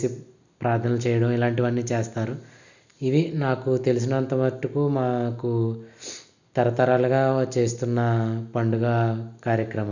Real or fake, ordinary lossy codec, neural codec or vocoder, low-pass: real; none; none; 7.2 kHz